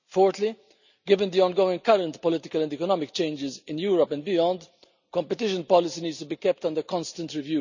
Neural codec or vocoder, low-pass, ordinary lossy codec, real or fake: none; 7.2 kHz; none; real